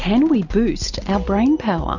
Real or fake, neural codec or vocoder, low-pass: real; none; 7.2 kHz